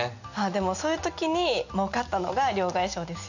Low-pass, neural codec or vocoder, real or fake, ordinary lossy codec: 7.2 kHz; none; real; none